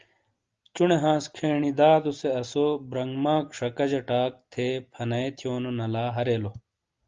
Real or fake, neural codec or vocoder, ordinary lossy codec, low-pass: real; none; Opus, 32 kbps; 7.2 kHz